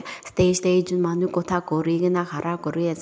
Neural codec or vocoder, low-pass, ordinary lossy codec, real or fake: none; none; none; real